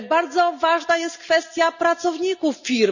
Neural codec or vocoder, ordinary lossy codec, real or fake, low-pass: none; none; real; 7.2 kHz